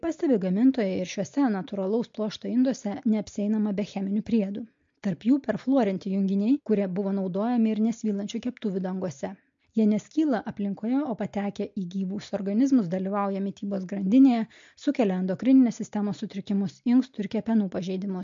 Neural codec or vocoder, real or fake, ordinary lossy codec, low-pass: none; real; MP3, 48 kbps; 7.2 kHz